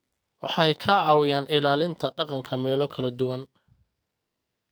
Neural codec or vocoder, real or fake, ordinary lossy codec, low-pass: codec, 44.1 kHz, 2.6 kbps, SNAC; fake; none; none